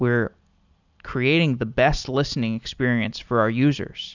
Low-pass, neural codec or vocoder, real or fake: 7.2 kHz; none; real